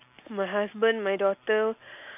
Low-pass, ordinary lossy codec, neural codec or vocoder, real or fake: 3.6 kHz; AAC, 32 kbps; none; real